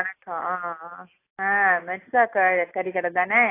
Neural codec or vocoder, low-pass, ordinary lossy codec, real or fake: none; 3.6 kHz; AAC, 24 kbps; real